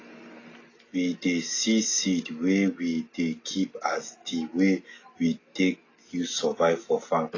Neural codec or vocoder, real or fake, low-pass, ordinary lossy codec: none; real; 7.2 kHz; AAC, 32 kbps